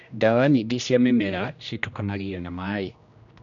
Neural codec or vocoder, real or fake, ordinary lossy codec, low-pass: codec, 16 kHz, 1 kbps, X-Codec, HuBERT features, trained on general audio; fake; none; 7.2 kHz